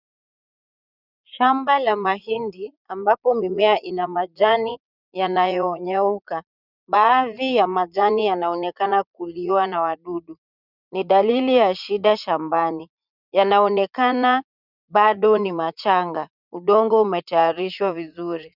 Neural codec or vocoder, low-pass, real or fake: vocoder, 44.1 kHz, 80 mel bands, Vocos; 5.4 kHz; fake